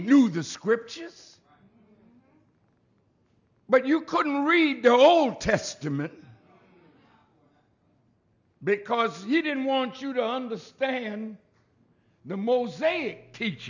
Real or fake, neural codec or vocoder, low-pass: real; none; 7.2 kHz